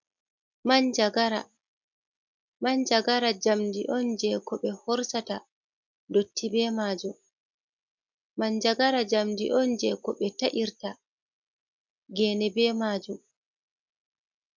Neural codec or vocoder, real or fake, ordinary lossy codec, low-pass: none; real; MP3, 64 kbps; 7.2 kHz